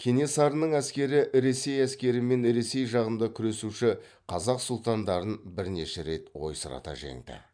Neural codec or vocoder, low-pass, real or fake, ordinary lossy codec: vocoder, 44.1 kHz, 128 mel bands every 512 samples, BigVGAN v2; 9.9 kHz; fake; none